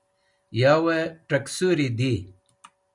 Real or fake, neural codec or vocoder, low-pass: real; none; 10.8 kHz